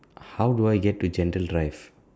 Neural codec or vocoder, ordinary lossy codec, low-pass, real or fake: none; none; none; real